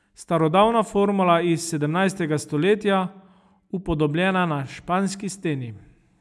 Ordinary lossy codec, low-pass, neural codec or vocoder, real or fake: none; none; none; real